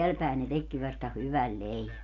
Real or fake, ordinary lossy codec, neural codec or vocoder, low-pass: real; none; none; 7.2 kHz